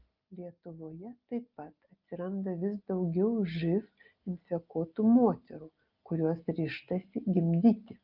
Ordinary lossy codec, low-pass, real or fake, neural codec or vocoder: Opus, 24 kbps; 5.4 kHz; real; none